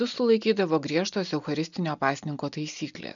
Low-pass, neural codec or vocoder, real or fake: 7.2 kHz; none; real